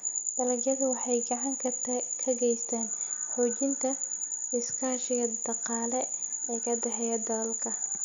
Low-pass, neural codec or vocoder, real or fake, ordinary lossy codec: 7.2 kHz; none; real; none